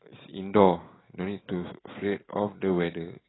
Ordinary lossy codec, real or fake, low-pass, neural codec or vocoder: AAC, 16 kbps; fake; 7.2 kHz; vocoder, 44.1 kHz, 128 mel bands every 512 samples, BigVGAN v2